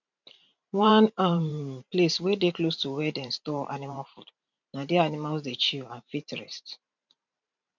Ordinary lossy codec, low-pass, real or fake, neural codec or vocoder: none; 7.2 kHz; fake; vocoder, 44.1 kHz, 128 mel bands every 512 samples, BigVGAN v2